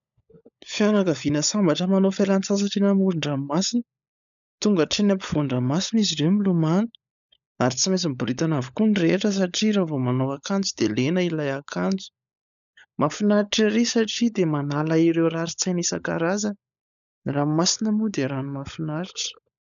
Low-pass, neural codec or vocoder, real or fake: 7.2 kHz; codec, 16 kHz, 16 kbps, FunCodec, trained on LibriTTS, 50 frames a second; fake